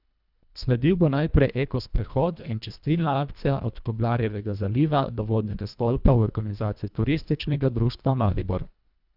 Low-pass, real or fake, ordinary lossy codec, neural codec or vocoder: 5.4 kHz; fake; none; codec, 24 kHz, 1.5 kbps, HILCodec